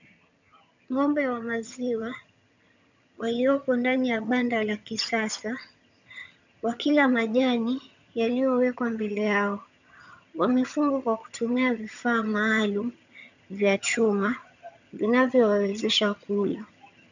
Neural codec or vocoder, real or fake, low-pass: vocoder, 22.05 kHz, 80 mel bands, HiFi-GAN; fake; 7.2 kHz